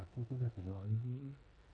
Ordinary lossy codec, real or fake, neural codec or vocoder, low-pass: none; fake; codec, 16 kHz in and 24 kHz out, 0.9 kbps, LongCat-Audio-Codec, four codebook decoder; 9.9 kHz